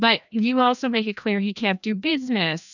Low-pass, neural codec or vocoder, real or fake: 7.2 kHz; codec, 16 kHz, 1 kbps, FreqCodec, larger model; fake